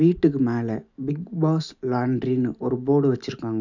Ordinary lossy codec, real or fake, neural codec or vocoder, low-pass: none; real; none; 7.2 kHz